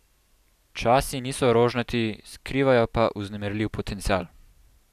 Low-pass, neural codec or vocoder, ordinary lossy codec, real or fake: 14.4 kHz; none; none; real